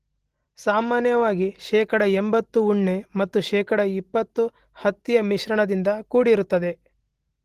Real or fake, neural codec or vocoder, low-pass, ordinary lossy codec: real; none; 14.4 kHz; Opus, 16 kbps